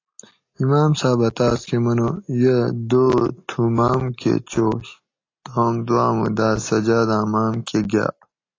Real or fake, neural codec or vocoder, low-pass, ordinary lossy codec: real; none; 7.2 kHz; AAC, 32 kbps